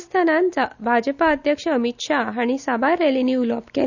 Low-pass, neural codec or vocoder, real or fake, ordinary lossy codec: 7.2 kHz; none; real; none